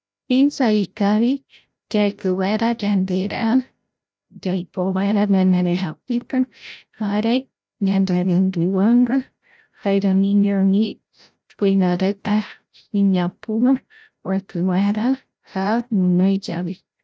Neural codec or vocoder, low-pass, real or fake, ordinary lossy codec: codec, 16 kHz, 0.5 kbps, FreqCodec, larger model; none; fake; none